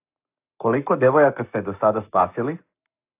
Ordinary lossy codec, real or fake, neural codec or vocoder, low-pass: AAC, 32 kbps; fake; codec, 44.1 kHz, 7.8 kbps, Pupu-Codec; 3.6 kHz